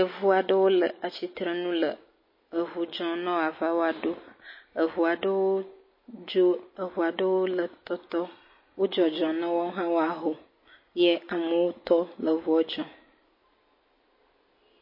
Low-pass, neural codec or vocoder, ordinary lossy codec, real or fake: 5.4 kHz; none; MP3, 24 kbps; real